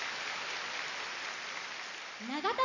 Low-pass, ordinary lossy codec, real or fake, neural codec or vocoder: 7.2 kHz; none; real; none